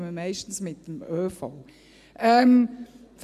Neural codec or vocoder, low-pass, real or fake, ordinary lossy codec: none; 14.4 kHz; real; none